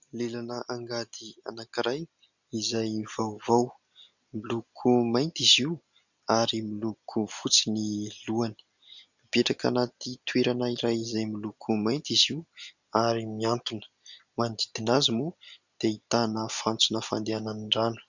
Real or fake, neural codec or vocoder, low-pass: real; none; 7.2 kHz